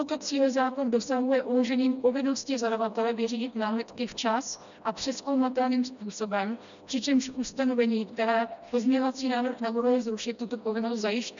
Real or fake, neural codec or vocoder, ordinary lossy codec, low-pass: fake; codec, 16 kHz, 1 kbps, FreqCodec, smaller model; MP3, 96 kbps; 7.2 kHz